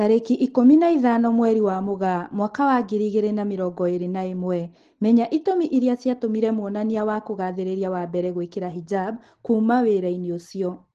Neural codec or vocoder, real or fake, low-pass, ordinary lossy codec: none; real; 10.8 kHz; Opus, 16 kbps